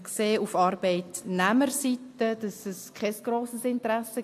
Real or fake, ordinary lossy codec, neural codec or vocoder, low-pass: real; AAC, 64 kbps; none; 14.4 kHz